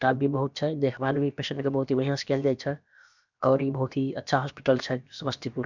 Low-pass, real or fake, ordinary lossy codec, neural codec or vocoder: 7.2 kHz; fake; none; codec, 16 kHz, about 1 kbps, DyCAST, with the encoder's durations